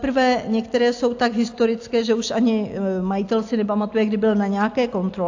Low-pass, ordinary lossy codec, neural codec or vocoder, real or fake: 7.2 kHz; AAC, 48 kbps; none; real